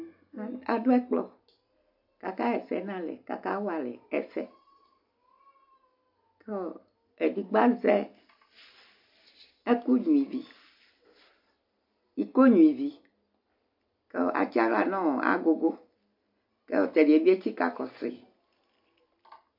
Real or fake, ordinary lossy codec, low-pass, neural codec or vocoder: real; MP3, 48 kbps; 5.4 kHz; none